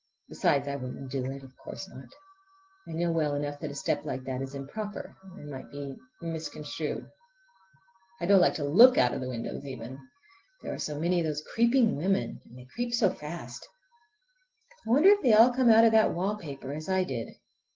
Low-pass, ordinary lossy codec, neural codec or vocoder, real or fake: 7.2 kHz; Opus, 16 kbps; none; real